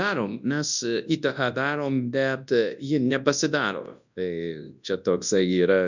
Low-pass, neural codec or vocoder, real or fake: 7.2 kHz; codec, 24 kHz, 0.9 kbps, WavTokenizer, large speech release; fake